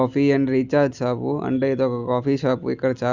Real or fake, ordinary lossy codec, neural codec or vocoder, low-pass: real; Opus, 64 kbps; none; 7.2 kHz